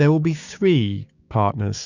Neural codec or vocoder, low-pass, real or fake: codec, 16 kHz, 6 kbps, DAC; 7.2 kHz; fake